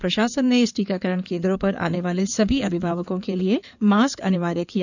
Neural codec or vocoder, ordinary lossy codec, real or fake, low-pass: codec, 16 kHz in and 24 kHz out, 2.2 kbps, FireRedTTS-2 codec; none; fake; 7.2 kHz